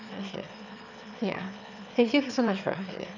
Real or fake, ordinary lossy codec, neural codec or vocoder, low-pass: fake; none; autoencoder, 22.05 kHz, a latent of 192 numbers a frame, VITS, trained on one speaker; 7.2 kHz